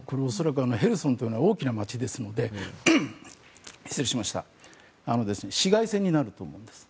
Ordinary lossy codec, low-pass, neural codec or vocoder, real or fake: none; none; none; real